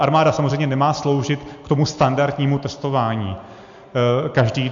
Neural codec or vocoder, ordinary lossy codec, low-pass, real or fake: none; AAC, 64 kbps; 7.2 kHz; real